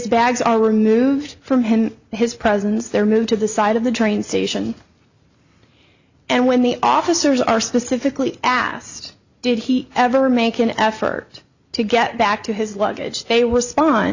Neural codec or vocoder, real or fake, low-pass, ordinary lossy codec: none; real; 7.2 kHz; Opus, 64 kbps